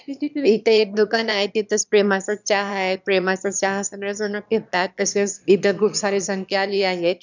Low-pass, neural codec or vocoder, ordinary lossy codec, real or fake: 7.2 kHz; autoencoder, 22.05 kHz, a latent of 192 numbers a frame, VITS, trained on one speaker; none; fake